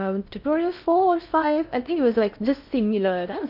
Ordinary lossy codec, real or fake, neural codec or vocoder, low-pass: none; fake; codec, 16 kHz in and 24 kHz out, 0.6 kbps, FocalCodec, streaming, 4096 codes; 5.4 kHz